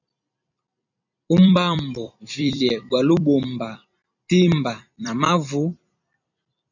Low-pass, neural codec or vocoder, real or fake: 7.2 kHz; vocoder, 44.1 kHz, 128 mel bands every 256 samples, BigVGAN v2; fake